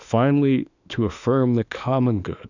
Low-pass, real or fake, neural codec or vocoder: 7.2 kHz; fake; autoencoder, 48 kHz, 32 numbers a frame, DAC-VAE, trained on Japanese speech